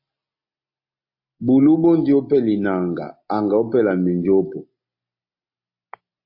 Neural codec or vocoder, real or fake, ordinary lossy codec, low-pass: none; real; MP3, 32 kbps; 5.4 kHz